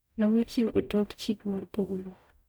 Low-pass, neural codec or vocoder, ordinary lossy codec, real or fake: none; codec, 44.1 kHz, 0.9 kbps, DAC; none; fake